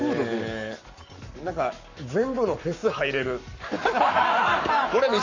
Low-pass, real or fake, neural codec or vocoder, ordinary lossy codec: 7.2 kHz; fake; codec, 44.1 kHz, 7.8 kbps, Pupu-Codec; AAC, 48 kbps